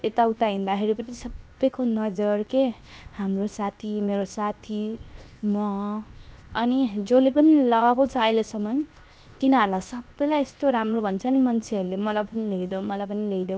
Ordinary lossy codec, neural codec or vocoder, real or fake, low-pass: none; codec, 16 kHz, 0.7 kbps, FocalCodec; fake; none